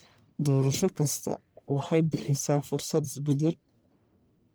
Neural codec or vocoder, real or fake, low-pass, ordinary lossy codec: codec, 44.1 kHz, 1.7 kbps, Pupu-Codec; fake; none; none